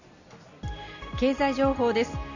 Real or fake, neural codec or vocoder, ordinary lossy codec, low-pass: real; none; none; 7.2 kHz